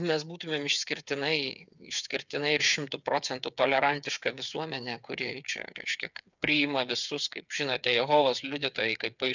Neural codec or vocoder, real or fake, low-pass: codec, 16 kHz, 8 kbps, FreqCodec, smaller model; fake; 7.2 kHz